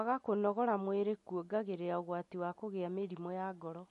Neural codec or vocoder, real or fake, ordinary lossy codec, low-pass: none; real; MP3, 48 kbps; 14.4 kHz